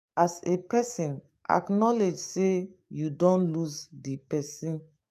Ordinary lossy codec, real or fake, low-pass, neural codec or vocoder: none; fake; 14.4 kHz; codec, 44.1 kHz, 7.8 kbps, DAC